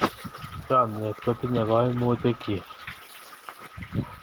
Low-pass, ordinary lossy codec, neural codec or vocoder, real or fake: 19.8 kHz; Opus, 16 kbps; none; real